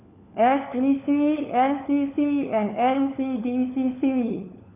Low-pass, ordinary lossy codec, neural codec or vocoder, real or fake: 3.6 kHz; none; codec, 16 kHz, 4 kbps, FunCodec, trained on LibriTTS, 50 frames a second; fake